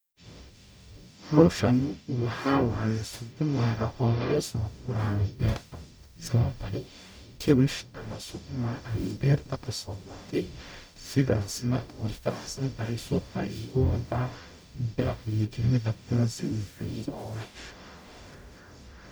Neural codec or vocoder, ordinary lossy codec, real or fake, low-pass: codec, 44.1 kHz, 0.9 kbps, DAC; none; fake; none